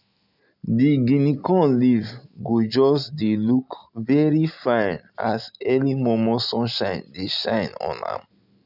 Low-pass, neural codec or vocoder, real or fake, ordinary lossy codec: 5.4 kHz; none; real; none